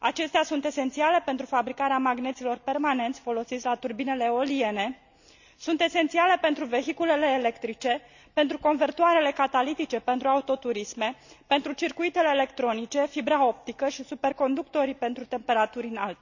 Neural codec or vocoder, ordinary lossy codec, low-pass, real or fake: none; none; 7.2 kHz; real